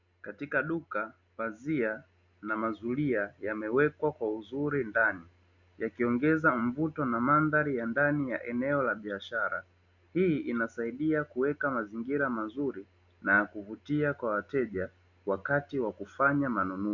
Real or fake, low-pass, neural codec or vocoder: real; 7.2 kHz; none